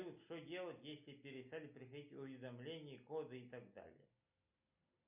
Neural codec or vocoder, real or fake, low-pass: none; real; 3.6 kHz